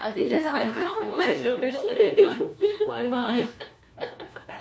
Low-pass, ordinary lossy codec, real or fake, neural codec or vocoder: none; none; fake; codec, 16 kHz, 1 kbps, FunCodec, trained on LibriTTS, 50 frames a second